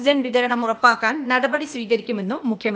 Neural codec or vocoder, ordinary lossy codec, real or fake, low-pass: codec, 16 kHz, 0.8 kbps, ZipCodec; none; fake; none